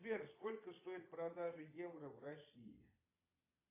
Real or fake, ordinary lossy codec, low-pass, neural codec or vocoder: fake; AAC, 32 kbps; 3.6 kHz; vocoder, 22.05 kHz, 80 mel bands, Vocos